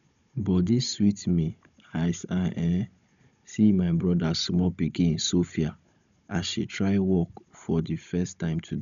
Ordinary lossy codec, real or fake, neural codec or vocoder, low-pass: none; fake; codec, 16 kHz, 16 kbps, FunCodec, trained on Chinese and English, 50 frames a second; 7.2 kHz